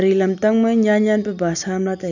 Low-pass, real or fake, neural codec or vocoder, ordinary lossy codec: 7.2 kHz; real; none; none